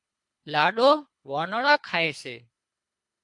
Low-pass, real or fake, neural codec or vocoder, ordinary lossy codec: 10.8 kHz; fake; codec, 24 kHz, 3 kbps, HILCodec; MP3, 64 kbps